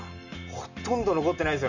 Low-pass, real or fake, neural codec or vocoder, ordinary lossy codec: 7.2 kHz; real; none; none